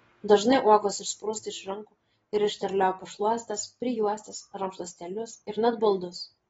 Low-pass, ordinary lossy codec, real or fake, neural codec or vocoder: 19.8 kHz; AAC, 24 kbps; real; none